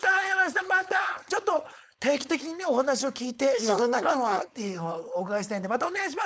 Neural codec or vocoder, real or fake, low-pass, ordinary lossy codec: codec, 16 kHz, 4.8 kbps, FACodec; fake; none; none